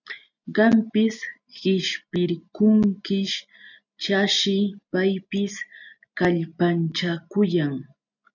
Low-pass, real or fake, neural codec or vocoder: 7.2 kHz; real; none